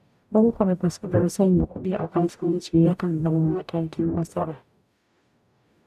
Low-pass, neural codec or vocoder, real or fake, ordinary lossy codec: 14.4 kHz; codec, 44.1 kHz, 0.9 kbps, DAC; fake; none